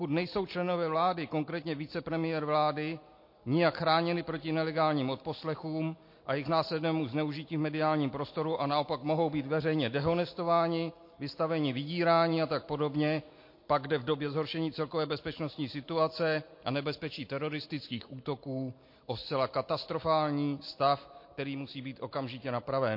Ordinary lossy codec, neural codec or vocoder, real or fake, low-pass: MP3, 32 kbps; none; real; 5.4 kHz